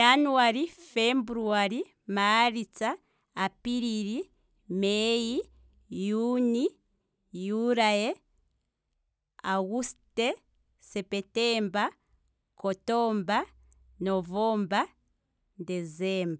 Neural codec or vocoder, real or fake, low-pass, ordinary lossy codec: none; real; none; none